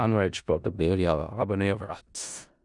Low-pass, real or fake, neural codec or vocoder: 10.8 kHz; fake; codec, 16 kHz in and 24 kHz out, 0.4 kbps, LongCat-Audio-Codec, four codebook decoder